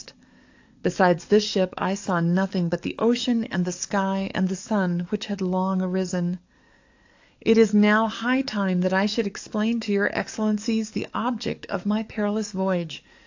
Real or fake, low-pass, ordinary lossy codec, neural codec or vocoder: fake; 7.2 kHz; AAC, 48 kbps; codec, 44.1 kHz, 7.8 kbps, DAC